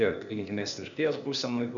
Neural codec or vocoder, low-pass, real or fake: codec, 16 kHz, 0.8 kbps, ZipCodec; 7.2 kHz; fake